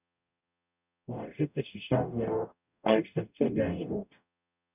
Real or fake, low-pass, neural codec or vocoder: fake; 3.6 kHz; codec, 44.1 kHz, 0.9 kbps, DAC